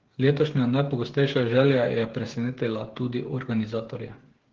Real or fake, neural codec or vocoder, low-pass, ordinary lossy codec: fake; codec, 16 kHz, 8 kbps, FreqCodec, smaller model; 7.2 kHz; Opus, 16 kbps